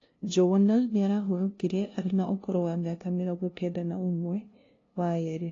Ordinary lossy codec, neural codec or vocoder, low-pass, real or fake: AAC, 32 kbps; codec, 16 kHz, 0.5 kbps, FunCodec, trained on LibriTTS, 25 frames a second; 7.2 kHz; fake